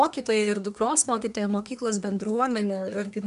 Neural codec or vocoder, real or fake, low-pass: codec, 24 kHz, 1 kbps, SNAC; fake; 10.8 kHz